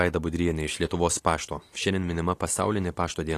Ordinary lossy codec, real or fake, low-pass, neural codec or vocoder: AAC, 48 kbps; real; 14.4 kHz; none